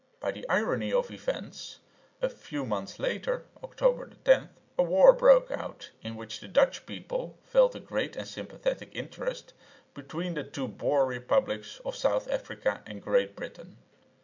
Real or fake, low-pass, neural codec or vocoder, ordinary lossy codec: real; 7.2 kHz; none; MP3, 64 kbps